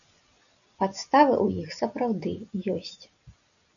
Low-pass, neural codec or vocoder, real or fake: 7.2 kHz; none; real